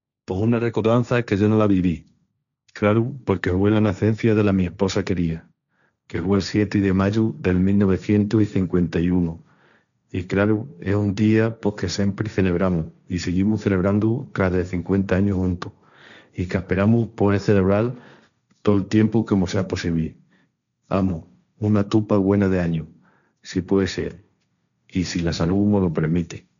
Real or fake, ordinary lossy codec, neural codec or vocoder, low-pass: fake; none; codec, 16 kHz, 1.1 kbps, Voila-Tokenizer; 7.2 kHz